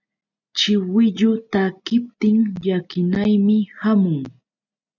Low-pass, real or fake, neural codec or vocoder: 7.2 kHz; real; none